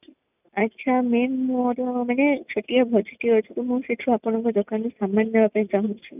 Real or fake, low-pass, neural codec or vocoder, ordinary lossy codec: real; 3.6 kHz; none; none